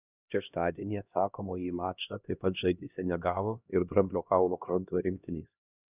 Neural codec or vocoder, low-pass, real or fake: codec, 16 kHz, 1 kbps, X-Codec, HuBERT features, trained on LibriSpeech; 3.6 kHz; fake